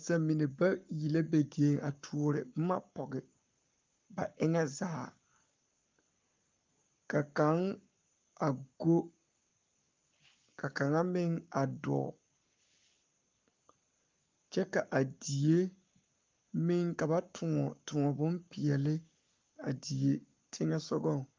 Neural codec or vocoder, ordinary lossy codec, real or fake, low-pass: codec, 44.1 kHz, 7.8 kbps, Pupu-Codec; Opus, 24 kbps; fake; 7.2 kHz